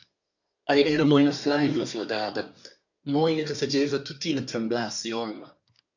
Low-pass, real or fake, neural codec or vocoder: 7.2 kHz; fake; codec, 24 kHz, 1 kbps, SNAC